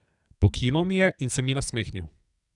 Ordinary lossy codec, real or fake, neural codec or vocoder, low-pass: none; fake; codec, 44.1 kHz, 2.6 kbps, SNAC; 10.8 kHz